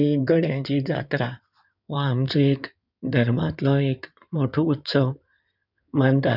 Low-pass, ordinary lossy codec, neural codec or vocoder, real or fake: 5.4 kHz; none; codec, 16 kHz in and 24 kHz out, 2.2 kbps, FireRedTTS-2 codec; fake